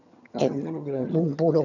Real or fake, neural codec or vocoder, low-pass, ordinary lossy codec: fake; vocoder, 22.05 kHz, 80 mel bands, HiFi-GAN; 7.2 kHz; none